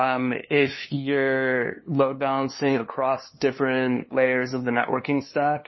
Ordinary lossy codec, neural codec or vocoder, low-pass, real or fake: MP3, 24 kbps; codec, 16 kHz, 2 kbps, FunCodec, trained on LibriTTS, 25 frames a second; 7.2 kHz; fake